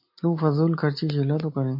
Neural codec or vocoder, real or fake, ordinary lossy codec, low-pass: none; real; MP3, 32 kbps; 5.4 kHz